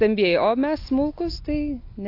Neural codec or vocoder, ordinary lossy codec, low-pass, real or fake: none; AAC, 32 kbps; 5.4 kHz; real